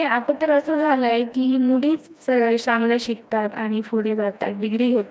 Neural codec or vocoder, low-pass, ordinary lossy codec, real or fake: codec, 16 kHz, 1 kbps, FreqCodec, smaller model; none; none; fake